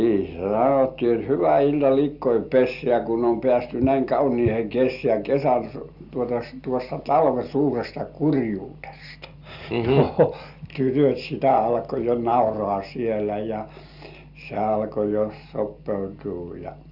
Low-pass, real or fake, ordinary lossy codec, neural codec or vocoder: 5.4 kHz; real; Opus, 64 kbps; none